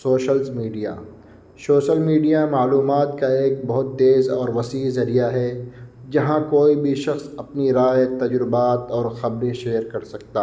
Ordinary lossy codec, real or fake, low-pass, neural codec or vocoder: none; real; none; none